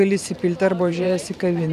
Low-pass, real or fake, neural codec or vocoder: 14.4 kHz; fake; vocoder, 44.1 kHz, 128 mel bands every 512 samples, BigVGAN v2